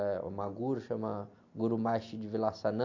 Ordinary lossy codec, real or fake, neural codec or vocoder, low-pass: AAC, 48 kbps; real; none; 7.2 kHz